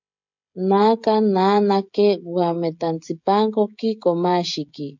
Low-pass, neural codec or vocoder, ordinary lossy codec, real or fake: 7.2 kHz; codec, 16 kHz, 16 kbps, FreqCodec, smaller model; MP3, 64 kbps; fake